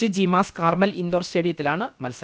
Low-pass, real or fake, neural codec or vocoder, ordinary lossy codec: none; fake; codec, 16 kHz, about 1 kbps, DyCAST, with the encoder's durations; none